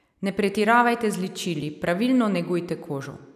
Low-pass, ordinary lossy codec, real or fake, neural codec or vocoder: 14.4 kHz; none; real; none